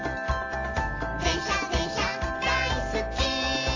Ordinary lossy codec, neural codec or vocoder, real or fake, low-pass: AAC, 32 kbps; none; real; 7.2 kHz